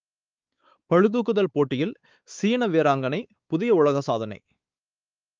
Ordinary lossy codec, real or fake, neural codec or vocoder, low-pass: Opus, 24 kbps; fake; codec, 16 kHz, 4 kbps, X-Codec, WavLM features, trained on Multilingual LibriSpeech; 7.2 kHz